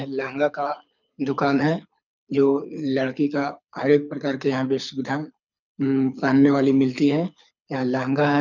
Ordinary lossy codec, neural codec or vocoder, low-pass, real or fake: none; codec, 24 kHz, 6 kbps, HILCodec; 7.2 kHz; fake